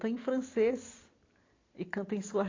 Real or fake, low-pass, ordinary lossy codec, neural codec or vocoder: real; 7.2 kHz; AAC, 32 kbps; none